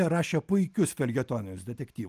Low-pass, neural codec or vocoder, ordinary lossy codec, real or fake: 14.4 kHz; none; Opus, 32 kbps; real